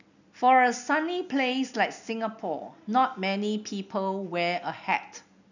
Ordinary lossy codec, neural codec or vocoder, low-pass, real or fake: none; none; 7.2 kHz; real